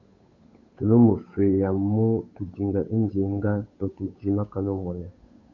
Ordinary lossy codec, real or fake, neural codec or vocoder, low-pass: Opus, 64 kbps; fake; codec, 16 kHz, 8 kbps, FunCodec, trained on Chinese and English, 25 frames a second; 7.2 kHz